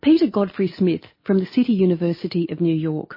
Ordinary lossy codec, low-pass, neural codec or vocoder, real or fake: MP3, 24 kbps; 5.4 kHz; none; real